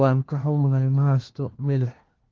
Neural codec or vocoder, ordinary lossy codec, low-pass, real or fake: codec, 16 kHz, 1 kbps, FunCodec, trained on LibriTTS, 50 frames a second; Opus, 16 kbps; 7.2 kHz; fake